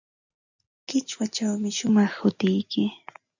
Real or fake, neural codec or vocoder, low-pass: real; none; 7.2 kHz